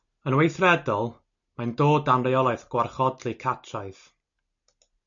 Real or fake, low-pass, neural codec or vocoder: real; 7.2 kHz; none